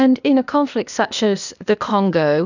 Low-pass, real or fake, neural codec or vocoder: 7.2 kHz; fake; codec, 16 kHz, 0.8 kbps, ZipCodec